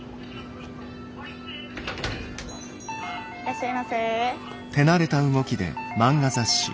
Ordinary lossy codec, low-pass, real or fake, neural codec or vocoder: none; none; real; none